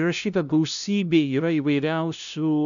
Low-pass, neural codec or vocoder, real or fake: 7.2 kHz; codec, 16 kHz, 0.5 kbps, FunCodec, trained on LibriTTS, 25 frames a second; fake